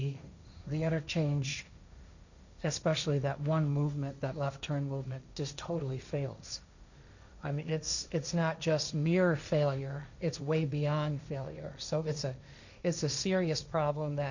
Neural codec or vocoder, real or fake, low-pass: codec, 16 kHz, 1.1 kbps, Voila-Tokenizer; fake; 7.2 kHz